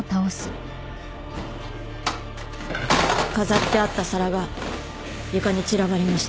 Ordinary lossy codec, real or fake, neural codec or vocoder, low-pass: none; real; none; none